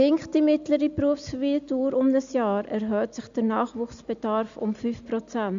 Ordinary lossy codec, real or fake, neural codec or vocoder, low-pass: AAC, 64 kbps; real; none; 7.2 kHz